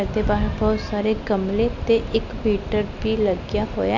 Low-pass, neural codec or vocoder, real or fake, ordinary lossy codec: 7.2 kHz; none; real; none